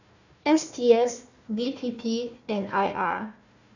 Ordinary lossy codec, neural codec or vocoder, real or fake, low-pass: none; codec, 16 kHz, 1 kbps, FunCodec, trained on Chinese and English, 50 frames a second; fake; 7.2 kHz